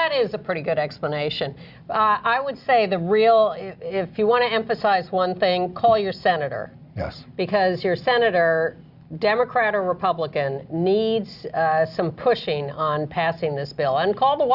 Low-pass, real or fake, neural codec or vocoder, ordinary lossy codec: 5.4 kHz; real; none; Opus, 64 kbps